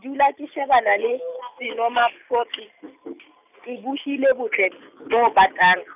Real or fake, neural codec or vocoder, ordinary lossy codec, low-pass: real; none; none; 3.6 kHz